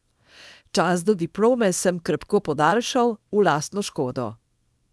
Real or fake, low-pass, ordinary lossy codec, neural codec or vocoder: fake; none; none; codec, 24 kHz, 0.9 kbps, WavTokenizer, small release